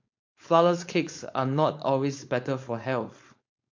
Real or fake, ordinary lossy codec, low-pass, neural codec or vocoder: fake; MP3, 48 kbps; 7.2 kHz; codec, 16 kHz, 4.8 kbps, FACodec